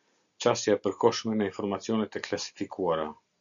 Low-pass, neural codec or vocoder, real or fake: 7.2 kHz; none; real